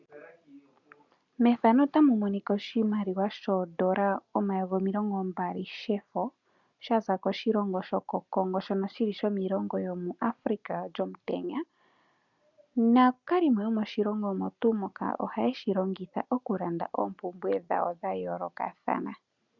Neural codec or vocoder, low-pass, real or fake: none; 7.2 kHz; real